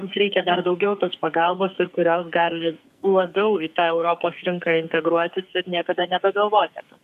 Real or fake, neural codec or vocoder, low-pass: fake; codec, 44.1 kHz, 2.6 kbps, SNAC; 14.4 kHz